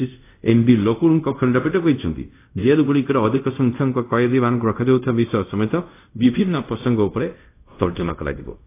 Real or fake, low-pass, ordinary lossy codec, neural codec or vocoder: fake; 3.6 kHz; AAC, 24 kbps; codec, 24 kHz, 0.5 kbps, DualCodec